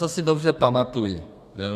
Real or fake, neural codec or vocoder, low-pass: fake; codec, 32 kHz, 1.9 kbps, SNAC; 14.4 kHz